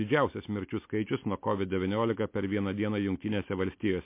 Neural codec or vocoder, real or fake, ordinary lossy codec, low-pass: none; real; MP3, 24 kbps; 3.6 kHz